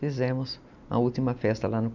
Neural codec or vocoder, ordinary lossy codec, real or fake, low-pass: none; none; real; 7.2 kHz